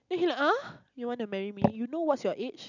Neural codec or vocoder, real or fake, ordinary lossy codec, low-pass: none; real; none; 7.2 kHz